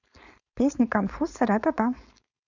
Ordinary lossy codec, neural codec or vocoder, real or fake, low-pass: none; codec, 16 kHz, 4.8 kbps, FACodec; fake; 7.2 kHz